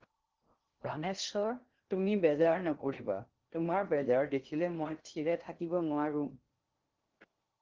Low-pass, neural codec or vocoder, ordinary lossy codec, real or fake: 7.2 kHz; codec, 16 kHz in and 24 kHz out, 0.6 kbps, FocalCodec, streaming, 2048 codes; Opus, 16 kbps; fake